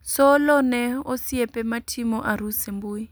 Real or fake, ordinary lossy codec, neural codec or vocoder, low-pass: real; none; none; none